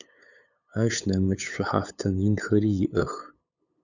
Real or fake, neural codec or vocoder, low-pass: fake; codec, 16 kHz, 8 kbps, FunCodec, trained on LibriTTS, 25 frames a second; 7.2 kHz